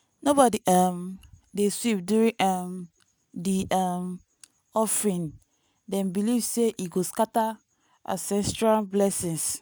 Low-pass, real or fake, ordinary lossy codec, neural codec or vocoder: none; real; none; none